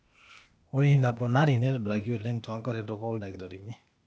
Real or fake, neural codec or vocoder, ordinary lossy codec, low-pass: fake; codec, 16 kHz, 0.8 kbps, ZipCodec; none; none